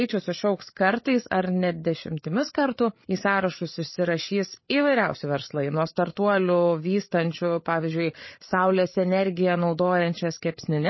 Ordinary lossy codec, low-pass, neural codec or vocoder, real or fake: MP3, 24 kbps; 7.2 kHz; codec, 16 kHz, 8 kbps, FreqCodec, larger model; fake